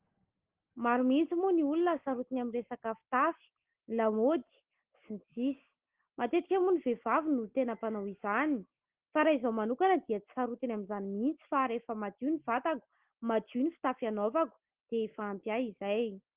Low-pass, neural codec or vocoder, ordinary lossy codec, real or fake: 3.6 kHz; none; Opus, 16 kbps; real